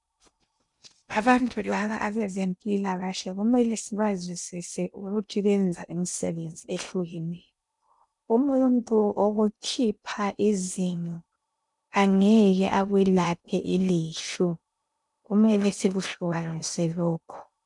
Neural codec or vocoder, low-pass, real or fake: codec, 16 kHz in and 24 kHz out, 0.6 kbps, FocalCodec, streaming, 2048 codes; 10.8 kHz; fake